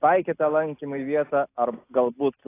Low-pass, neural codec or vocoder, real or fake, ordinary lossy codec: 3.6 kHz; none; real; AAC, 24 kbps